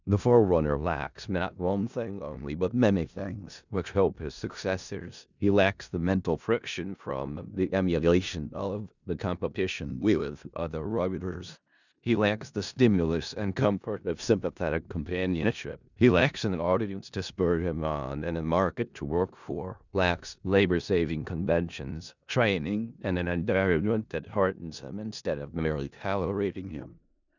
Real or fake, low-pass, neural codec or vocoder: fake; 7.2 kHz; codec, 16 kHz in and 24 kHz out, 0.4 kbps, LongCat-Audio-Codec, four codebook decoder